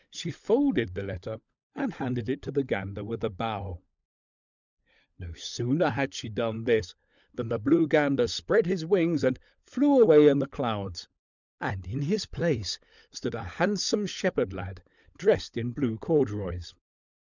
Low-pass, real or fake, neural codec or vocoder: 7.2 kHz; fake; codec, 16 kHz, 16 kbps, FunCodec, trained on LibriTTS, 50 frames a second